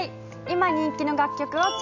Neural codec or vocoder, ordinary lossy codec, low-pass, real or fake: none; none; 7.2 kHz; real